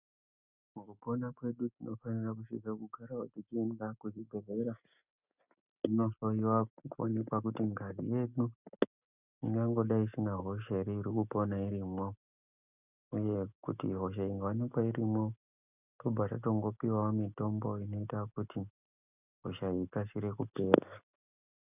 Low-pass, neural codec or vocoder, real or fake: 3.6 kHz; none; real